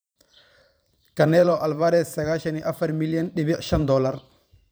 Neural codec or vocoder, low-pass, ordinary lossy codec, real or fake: vocoder, 44.1 kHz, 128 mel bands every 512 samples, BigVGAN v2; none; none; fake